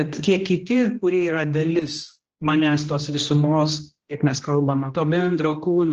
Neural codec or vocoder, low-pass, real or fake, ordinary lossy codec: codec, 16 kHz, 1 kbps, X-Codec, HuBERT features, trained on general audio; 7.2 kHz; fake; Opus, 16 kbps